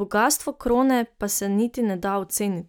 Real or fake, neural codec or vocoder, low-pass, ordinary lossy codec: real; none; none; none